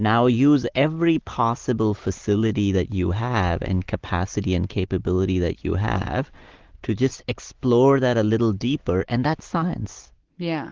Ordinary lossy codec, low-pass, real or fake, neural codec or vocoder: Opus, 16 kbps; 7.2 kHz; real; none